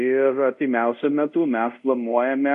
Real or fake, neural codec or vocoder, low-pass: fake; codec, 24 kHz, 0.5 kbps, DualCodec; 10.8 kHz